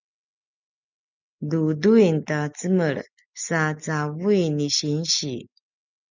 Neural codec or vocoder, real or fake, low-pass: none; real; 7.2 kHz